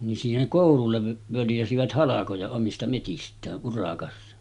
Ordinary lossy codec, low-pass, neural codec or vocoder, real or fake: none; 10.8 kHz; none; real